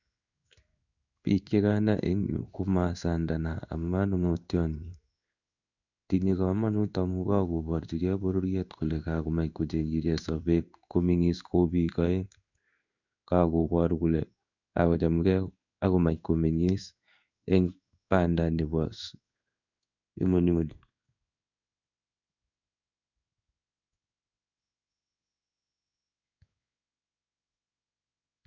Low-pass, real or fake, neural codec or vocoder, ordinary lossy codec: 7.2 kHz; fake; codec, 16 kHz in and 24 kHz out, 1 kbps, XY-Tokenizer; none